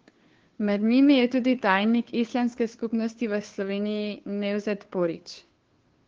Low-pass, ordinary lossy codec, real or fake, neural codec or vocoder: 7.2 kHz; Opus, 16 kbps; fake; codec, 16 kHz, 2 kbps, FunCodec, trained on Chinese and English, 25 frames a second